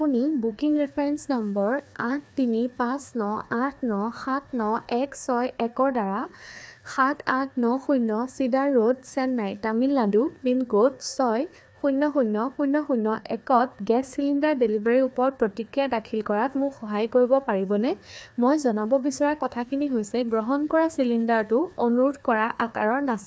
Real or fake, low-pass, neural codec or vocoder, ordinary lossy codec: fake; none; codec, 16 kHz, 2 kbps, FreqCodec, larger model; none